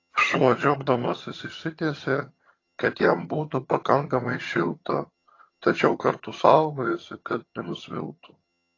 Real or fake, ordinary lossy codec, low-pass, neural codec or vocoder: fake; AAC, 32 kbps; 7.2 kHz; vocoder, 22.05 kHz, 80 mel bands, HiFi-GAN